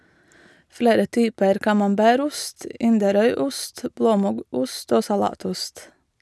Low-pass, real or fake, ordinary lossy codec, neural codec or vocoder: none; real; none; none